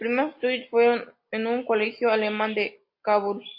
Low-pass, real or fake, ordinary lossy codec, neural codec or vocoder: 5.4 kHz; real; MP3, 32 kbps; none